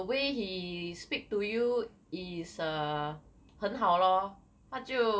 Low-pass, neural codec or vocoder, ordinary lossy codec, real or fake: none; none; none; real